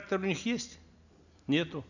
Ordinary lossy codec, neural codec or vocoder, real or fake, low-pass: none; none; real; 7.2 kHz